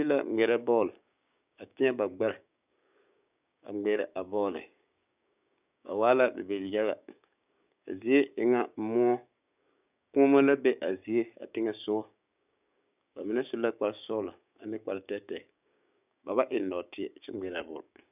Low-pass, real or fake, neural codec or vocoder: 3.6 kHz; fake; codec, 16 kHz, 6 kbps, DAC